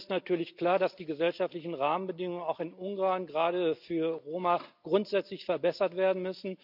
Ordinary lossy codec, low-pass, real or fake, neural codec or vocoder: none; 5.4 kHz; real; none